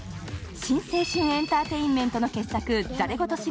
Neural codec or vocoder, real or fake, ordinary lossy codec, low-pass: none; real; none; none